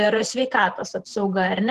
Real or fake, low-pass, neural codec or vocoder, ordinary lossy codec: fake; 14.4 kHz; vocoder, 48 kHz, 128 mel bands, Vocos; Opus, 16 kbps